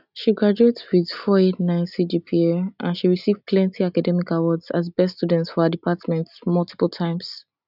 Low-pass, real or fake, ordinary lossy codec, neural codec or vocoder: 5.4 kHz; real; none; none